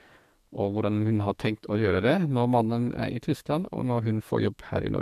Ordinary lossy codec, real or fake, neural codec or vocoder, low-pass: MP3, 96 kbps; fake; codec, 44.1 kHz, 2.6 kbps, SNAC; 14.4 kHz